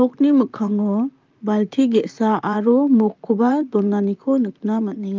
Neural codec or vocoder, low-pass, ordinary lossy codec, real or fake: vocoder, 44.1 kHz, 128 mel bands, Pupu-Vocoder; 7.2 kHz; Opus, 24 kbps; fake